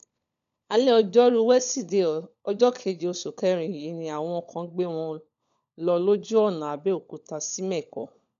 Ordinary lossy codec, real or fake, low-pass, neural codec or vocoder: none; fake; 7.2 kHz; codec, 16 kHz, 16 kbps, FunCodec, trained on LibriTTS, 50 frames a second